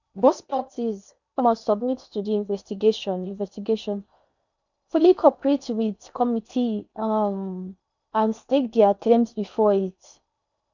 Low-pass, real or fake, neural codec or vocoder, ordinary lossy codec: 7.2 kHz; fake; codec, 16 kHz in and 24 kHz out, 0.8 kbps, FocalCodec, streaming, 65536 codes; none